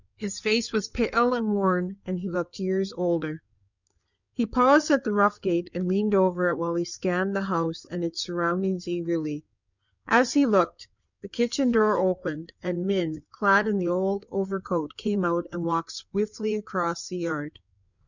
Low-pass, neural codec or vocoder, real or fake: 7.2 kHz; codec, 16 kHz in and 24 kHz out, 2.2 kbps, FireRedTTS-2 codec; fake